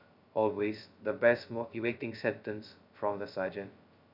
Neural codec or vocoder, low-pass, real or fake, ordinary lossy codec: codec, 16 kHz, 0.2 kbps, FocalCodec; 5.4 kHz; fake; none